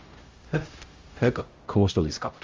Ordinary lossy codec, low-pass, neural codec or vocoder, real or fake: Opus, 32 kbps; 7.2 kHz; codec, 16 kHz, 0.5 kbps, X-Codec, HuBERT features, trained on LibriSpeech; fake